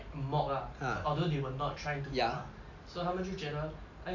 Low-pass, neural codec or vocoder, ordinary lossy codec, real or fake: 7.2 kHz; none; AAC, 48 kbps; real